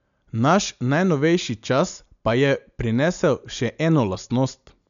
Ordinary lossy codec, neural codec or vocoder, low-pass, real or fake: none; none; 7.2 kHz; real